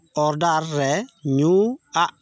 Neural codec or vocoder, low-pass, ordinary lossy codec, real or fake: none; none; none; real